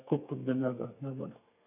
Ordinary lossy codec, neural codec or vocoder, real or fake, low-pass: none; codec, 32 kHz, 1.9 kbps, SNAC; fake; 3.6 kHz